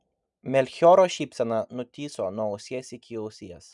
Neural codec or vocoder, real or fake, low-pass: none; real; 10.8 kHz